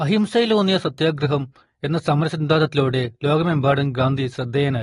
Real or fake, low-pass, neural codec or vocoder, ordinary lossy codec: real; 10.8 kHz; none; AAC, 32 kbps